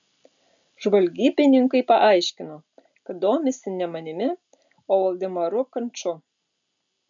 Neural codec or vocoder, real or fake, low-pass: none; real; 7.2 kHz